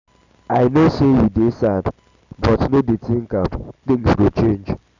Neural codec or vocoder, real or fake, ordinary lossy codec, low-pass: none; real; none; 7.2 kHz